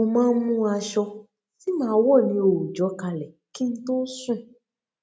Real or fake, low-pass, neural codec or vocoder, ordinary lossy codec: real; none; none; none